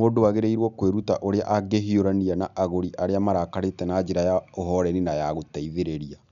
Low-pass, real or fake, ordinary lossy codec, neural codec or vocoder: 7.2 kHz; real; none; none